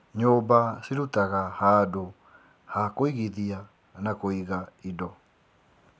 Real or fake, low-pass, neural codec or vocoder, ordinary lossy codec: real; none; none; none